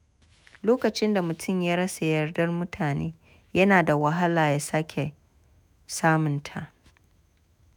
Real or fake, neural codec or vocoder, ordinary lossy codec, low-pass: fake; autoencoder, 48 kHz, 128 numbers a frame, DAC-VAE, trained on Japanese speech; none; none